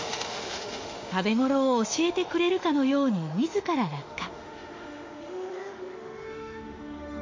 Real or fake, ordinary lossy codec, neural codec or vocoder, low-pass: fake; MP3, 48 kbps; autoencoder, 48 kHz, 32 numbers a frame, DAC-VAE, trained on Japanese speech; 7.2 kHz